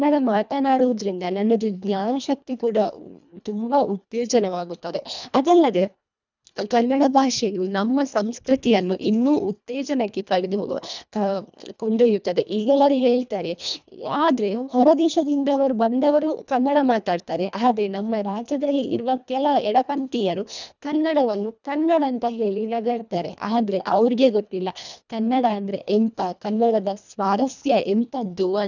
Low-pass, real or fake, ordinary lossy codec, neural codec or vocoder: 7.2 kHz; fake; none; codec, 24 kHz, 1.5 kbps, HILCodec